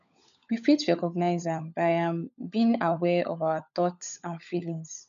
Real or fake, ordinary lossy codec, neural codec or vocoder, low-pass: fake; none; codec, 16 kHz, 16 kbps, FunCodec, trained on LibriTTS, 50 frames a second; 7.2 kHz